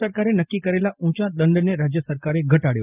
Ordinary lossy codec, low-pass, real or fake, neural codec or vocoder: Opus, 24 kbps; 3.6 kHz; real; none